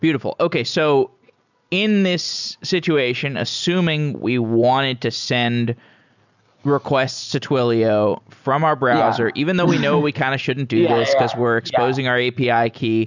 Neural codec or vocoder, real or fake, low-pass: none; real; 7.2 kHz